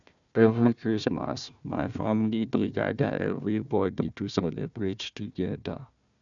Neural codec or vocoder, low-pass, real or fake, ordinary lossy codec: codec, 16 kHz, 1 kbps, FunCodec, trained on Chinese and English, 50 frames a second; 7.2 kHz; fake; none